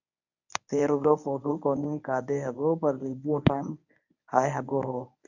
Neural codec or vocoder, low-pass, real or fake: codec, 24 kHz, 0.9 kbps, WavTokenizer, medium speech release version 1; 7.2 kHz; fake